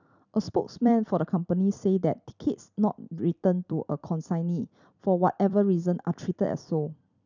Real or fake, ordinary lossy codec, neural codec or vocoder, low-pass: fake; none; vocoder, 44.1 kHz, 128 mel bands every 256 samples, BigVGAN v2; 7.2 kHz